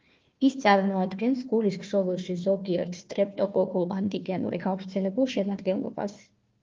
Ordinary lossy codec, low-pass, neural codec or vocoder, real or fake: Opus, 24 kbps; 7.2 kHz; codec, 16 kHz, 1 kbps, FunCodec, trained on Chinese and English, 50 frames a second; fake